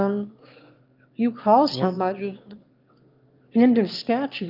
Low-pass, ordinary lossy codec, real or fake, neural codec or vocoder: 5.4 kHz; Opus, 24 kbps; fake; autoencoder, 22.05 kHz, a latent of 192 numbers a frame, VITS, trained on one speaker